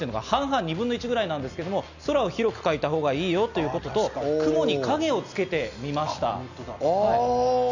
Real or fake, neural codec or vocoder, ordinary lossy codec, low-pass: real; none; none; 7.2 kHz